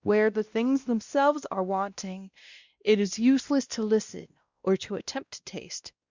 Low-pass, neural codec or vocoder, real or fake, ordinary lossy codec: 7.2 kHz; codec, 16 kHz, 1 kbps, X-Codec, WavLM features, trained on Multilingual LibriSpeech; fake; Opus, 64 kbps